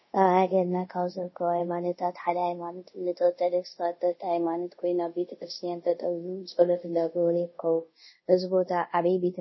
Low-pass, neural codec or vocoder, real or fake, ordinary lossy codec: 7.2 kHz; codec, 24 kHz, 0.5 kbps, DualCodec; fake; MP3, 24 kbps